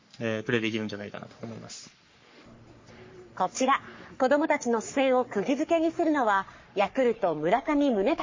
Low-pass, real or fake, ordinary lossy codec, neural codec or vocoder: 7.2 kHz; fake; MP3, 32 kbps; codec, 44.1 kHz, 3.4 kbps, Pupu-Codec